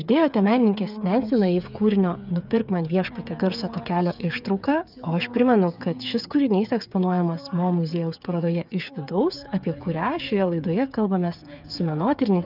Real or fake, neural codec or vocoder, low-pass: fake; codec, 16 kHz, 8 kbps, FreqCodec, smaller model; 5.4 kHz